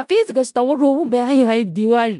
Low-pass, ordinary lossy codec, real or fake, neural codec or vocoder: 10.8 kHz; none; fake; codec, 16 kHz in and 24 kHz out, 0.4 kbps, LongCat-Audio-Codec, four codebook decoder